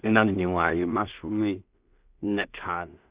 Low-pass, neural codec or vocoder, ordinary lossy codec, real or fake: 3.6 kHz; codec, 16 kHz in and 24 kHz out, 0.4 kbps, LongCat-Audio-Codec, two codebook decoder; Opus, 64 kbps; fake